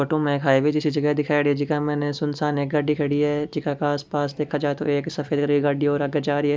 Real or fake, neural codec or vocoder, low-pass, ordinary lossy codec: real; none; none; none